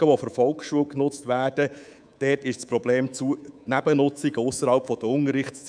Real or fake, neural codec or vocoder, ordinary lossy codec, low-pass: fake; codec, 24 kHz, 3.1 kbps, DualCodec; none; 9.9 kHz